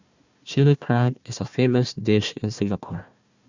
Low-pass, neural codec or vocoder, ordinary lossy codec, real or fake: 7.2 kHz; codec, 16 kHz, 1 kbps, FunCodec, trained on Chinese and English, 50 frames a second; Opus, 64 kbps; fake